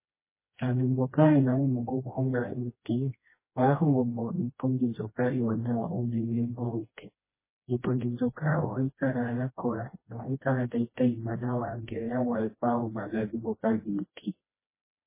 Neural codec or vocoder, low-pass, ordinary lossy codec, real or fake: codec, 16 kHz, 1 kbps, FreqCodec, smaller model; 3.6 kHz; MP3, 16 kbps; fake